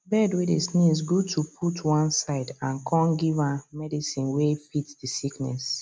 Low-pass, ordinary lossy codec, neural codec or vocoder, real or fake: none; none; none; real